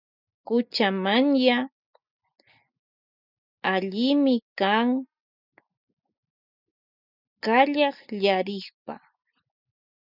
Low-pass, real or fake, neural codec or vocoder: 5.4 kHz; real; none